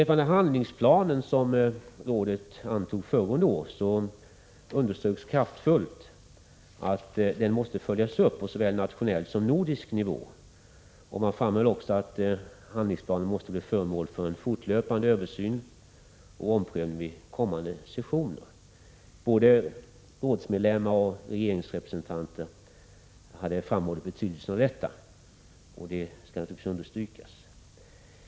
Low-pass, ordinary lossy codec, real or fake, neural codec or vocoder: none; none; real; none